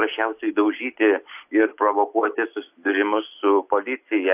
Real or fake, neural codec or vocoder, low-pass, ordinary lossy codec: real; none; 3.6 kHz; AAC, 32 kbps